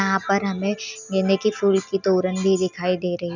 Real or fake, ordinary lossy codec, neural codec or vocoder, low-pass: real; none; none; 7.2 kHz